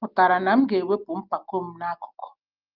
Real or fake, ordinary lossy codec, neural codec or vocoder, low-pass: real; Opus, 32 kbps; none; 5.4 kHz